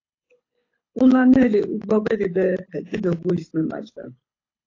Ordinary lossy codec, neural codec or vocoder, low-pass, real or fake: AAC, 32 kbps; codec, 24 kHz, 6 kbps, HILCodec; 7.2 kHz; fake